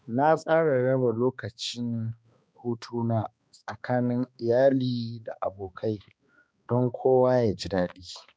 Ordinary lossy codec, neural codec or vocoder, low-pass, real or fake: none; codec, 16 kHz, 2 kbps, X-Codec, HuBERT features, trained on balanced general audio; none; fake